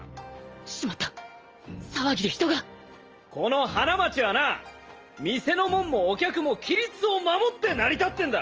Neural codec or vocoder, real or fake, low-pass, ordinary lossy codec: none; real; 7.2 kHz; Opus, 24 kbps